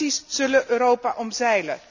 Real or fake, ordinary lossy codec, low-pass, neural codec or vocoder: real; none; 7.2 kHz; none